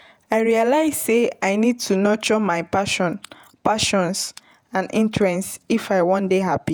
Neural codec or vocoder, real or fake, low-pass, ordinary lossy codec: vocoder, 48 kHz, 128 mel bands, Vocos; fake; none; none